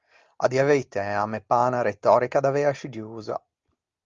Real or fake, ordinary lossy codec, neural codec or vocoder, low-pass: real; Opus, 32 kbps; none; 7.2 kHz